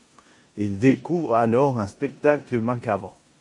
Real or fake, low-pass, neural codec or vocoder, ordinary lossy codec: fake; 10.8 kHz; codec, 16 kHz in and 24 kHz out, 0.9 kbps, LongCat-Audio-Codec, four codebook decoder; MP3, 48 kbps